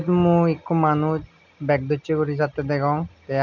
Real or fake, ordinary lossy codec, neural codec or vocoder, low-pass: real; none; none; 7.2 kHz